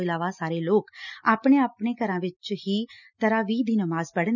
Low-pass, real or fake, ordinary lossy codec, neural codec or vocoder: 7.2 kHz; real; none; none